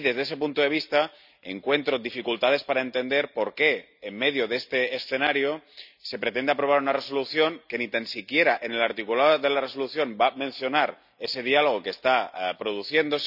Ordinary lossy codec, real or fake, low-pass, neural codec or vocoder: none; real; 5.4 kHz; none